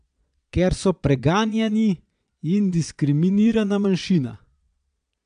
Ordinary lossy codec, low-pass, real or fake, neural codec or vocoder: AAC, 96 kbps; 9.9 kHz; fake; vocoder, 22.05 kHz, 80 mel bands, Vocos